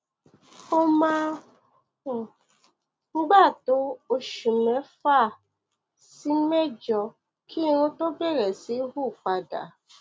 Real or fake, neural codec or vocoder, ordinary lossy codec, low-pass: real; none; none; none